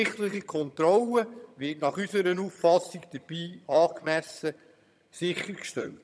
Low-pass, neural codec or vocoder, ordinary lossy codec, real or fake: none; vocoder, 22.05 kHz, 80 mel bands, HiFi-GAN; none; fake